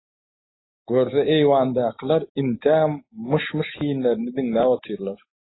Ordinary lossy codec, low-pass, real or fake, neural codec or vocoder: AAC, 16 kbps; 7.2 kHz; real; none